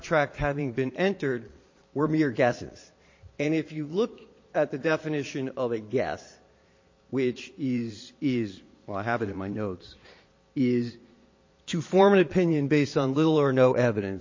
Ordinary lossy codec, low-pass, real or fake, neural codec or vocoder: MP3, 32 kbps; 7.2 kHz; fake; vocoder, 22.05 kHz, 80 mel bands, Vocos